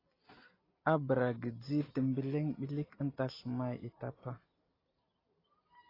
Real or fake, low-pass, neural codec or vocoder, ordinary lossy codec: real; 5.4 kHz; none; AAC, 24 kbps